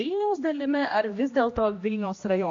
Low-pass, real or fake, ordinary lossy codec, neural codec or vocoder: 7.2 kHz; fake; AAC, 48 kbps; codec, 16 kHz, 1 kbps, X-Codec, HuBERT features, trained on general audio